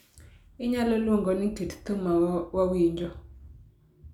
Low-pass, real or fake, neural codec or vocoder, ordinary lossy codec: 19.8 kHz; real; none; none